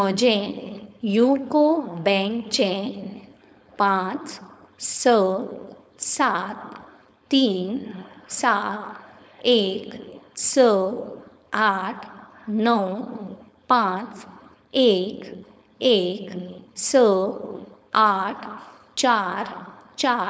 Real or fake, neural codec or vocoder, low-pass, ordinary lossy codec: fake; codec, 16 kHz, 4.8 kbps, FACodec; none; none